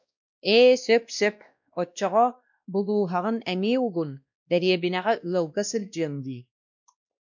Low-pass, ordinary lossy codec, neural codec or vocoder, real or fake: 7.2 kHz; MP3, 64 kbps; codec, 16 kHz, 1 kbps, X-Codec, WavLM features, trained on Multilingual LibriSpeech; fake